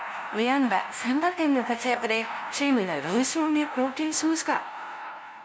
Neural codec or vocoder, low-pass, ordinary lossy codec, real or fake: codec, 16 kHz, 0.5 kbps, FunCodec, trained on LibriTTS, 25 frames a second; none; none; fake